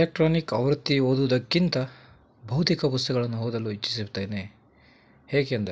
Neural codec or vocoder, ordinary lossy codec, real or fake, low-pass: none; none; real; none